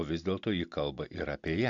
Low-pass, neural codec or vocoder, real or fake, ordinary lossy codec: 7.2 kHz; none; real; AAC, 64 kbps